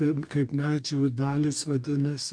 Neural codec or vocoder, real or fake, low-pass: codec, 44.1 kHz, 2.6 kbps, DAC; fake; 9.9 kHz